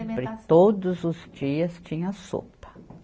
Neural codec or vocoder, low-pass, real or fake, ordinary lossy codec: none; none; real; none